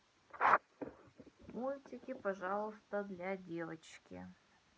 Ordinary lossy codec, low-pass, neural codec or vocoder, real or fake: none; none; none; real